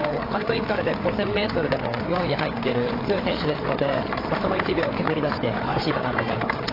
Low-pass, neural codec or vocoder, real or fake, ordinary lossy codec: 5.4 kHz; codec, 16 kHz, 8 kbps, FreqCodec, larger model; fake; AAC, 24 kbps